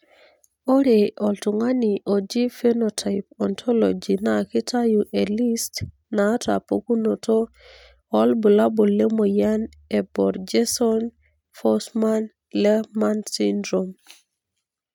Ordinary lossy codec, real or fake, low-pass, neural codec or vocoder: none; real; 19.8 kHz; none